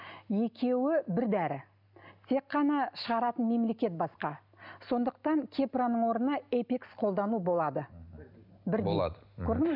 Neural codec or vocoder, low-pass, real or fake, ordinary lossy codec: none; 5.4 kHz; real; none